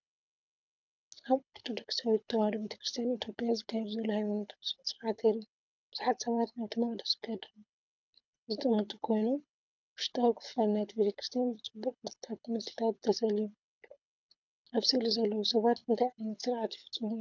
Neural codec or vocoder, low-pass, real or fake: codec, 24 kHz, 6 kbps, HILCodec; 7.2 kHz; fake